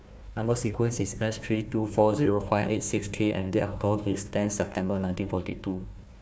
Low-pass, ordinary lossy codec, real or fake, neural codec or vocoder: none; none; fake; codec, 16 kHz, 1 kbps, FunCodec, trained on Chinese and English, 50 frames a second